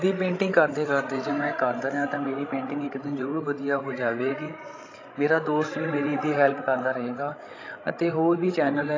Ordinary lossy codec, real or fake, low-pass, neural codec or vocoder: AAC, 32 kbps; fake; 7.2 kHz; codec, 16 kHz, 16 kbps, FreqCodec, larger model